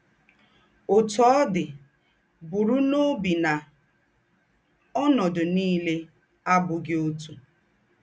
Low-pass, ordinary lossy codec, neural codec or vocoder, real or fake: none; none; none; real